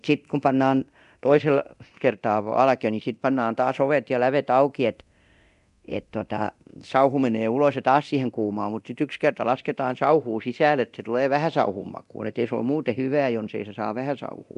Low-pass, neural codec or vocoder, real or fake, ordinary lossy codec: 10.8 kHz; codec, 24 kHz, 0.9 kbps, DualCodec; fake; none